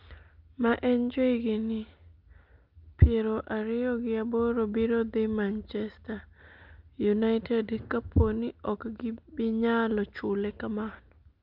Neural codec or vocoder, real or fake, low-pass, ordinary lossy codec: none; real; 5.4 kHz; Opus, 24 kbps